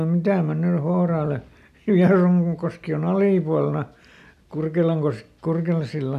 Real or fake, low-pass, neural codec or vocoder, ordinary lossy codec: real; 14.4 kHz; none; none